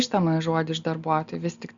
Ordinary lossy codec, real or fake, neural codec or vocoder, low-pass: Opus, 64 kbps; real; none; 7.2 kHz